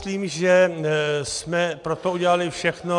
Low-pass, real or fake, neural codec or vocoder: 10.8 kHz; real; none